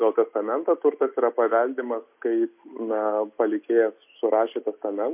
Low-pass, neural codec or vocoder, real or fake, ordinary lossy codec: 3.6 kHz; none; real; MP3, 32 kbps